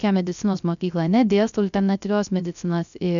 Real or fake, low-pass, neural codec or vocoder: fake; 7.2 kHz; codec, 16 kHz, 0.3 kbps, FocalCodec